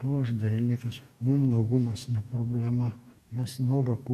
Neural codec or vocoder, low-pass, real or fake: codec, 44.1 kHz, 2.6 kbps, DAC; 14.4 kHz; fake